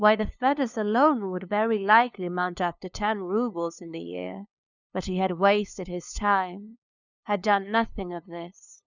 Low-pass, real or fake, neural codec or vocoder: 7.2 kHz; fake; codec, 16 kHz, 2 kbps, FunCodec, trained on LibriTTS, 25 frames a second